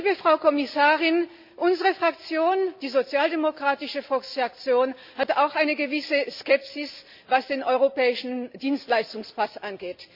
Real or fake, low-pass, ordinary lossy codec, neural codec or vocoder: real; 5.4 kHz; MP3, 48 kbps; none